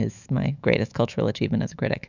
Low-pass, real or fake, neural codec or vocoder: 7.2 kHz; fake; autoencoder, 48 kHz, 128 numbers a frame, DAC-VAE, trained on Japanese speech